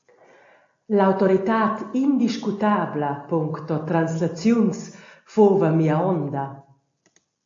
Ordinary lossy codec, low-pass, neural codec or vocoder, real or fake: AAC, 48 kbps; 7.2 kHz; none; real